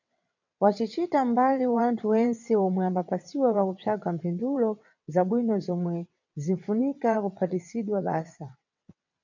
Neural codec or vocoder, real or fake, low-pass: vocoder, 22.05 kHz, 80 mel bands, WaveNeXt; fake; 7.2 kHz